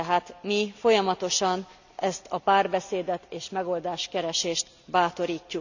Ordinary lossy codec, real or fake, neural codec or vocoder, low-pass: none; real; none; 7.2 kHz